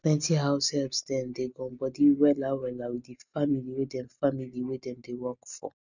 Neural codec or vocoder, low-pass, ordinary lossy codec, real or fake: none; 7.2 kHz; none; real